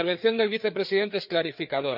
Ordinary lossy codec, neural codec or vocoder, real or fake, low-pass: none; codec, 16 kHz, 4 kbps, FreqCodec, larger model; fake; 5.4 kHz